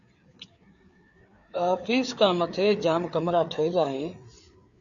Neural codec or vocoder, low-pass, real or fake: codec, 16 kHz, 4 kbps, FreqCodec, larger model; 7.2 kHz; fake